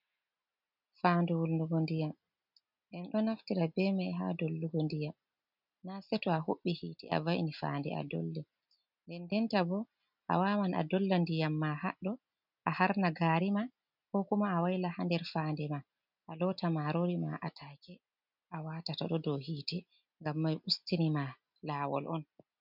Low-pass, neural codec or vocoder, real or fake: 5.4 kHz; none; real